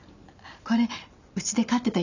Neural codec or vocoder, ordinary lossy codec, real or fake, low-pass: none; none; real; 7.2 kHz